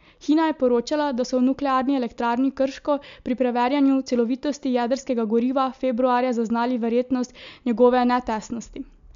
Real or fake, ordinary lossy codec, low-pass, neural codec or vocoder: real; MP3, 64 kbps; 7.2 kHz; none